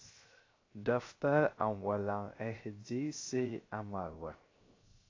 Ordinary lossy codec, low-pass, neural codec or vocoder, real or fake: AAC, 32 kbps; 7.2 kHz; codec, 16 kHz, 0.3 kbps, FocalCodec; fake